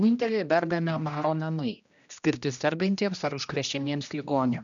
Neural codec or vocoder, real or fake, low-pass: codec, 16 kHz, 1 kbps, X-Codec, HuBERT features, trained on general audio; fake; 7.2 kHz